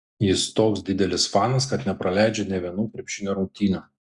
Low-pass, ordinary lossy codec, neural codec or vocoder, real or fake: 10.8 kHz; Opus, 64 kbps; none; real